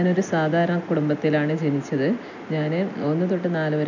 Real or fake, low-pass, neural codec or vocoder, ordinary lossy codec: real; 7.2 kHz; none; none